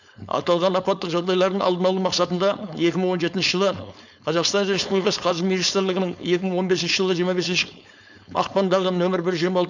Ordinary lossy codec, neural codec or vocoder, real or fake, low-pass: none; codec, 16 kHz, 4.8 kbps, FACodec; fake; 7.2 kHz